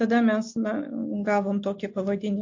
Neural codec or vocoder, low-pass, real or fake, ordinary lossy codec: none; 7.2 kHz; real; MP3, 48 kbps